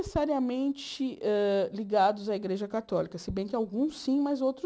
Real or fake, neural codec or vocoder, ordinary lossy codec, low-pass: real; none; none; none